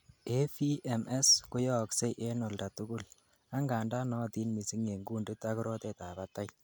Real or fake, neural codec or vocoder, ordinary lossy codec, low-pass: real; none; none; none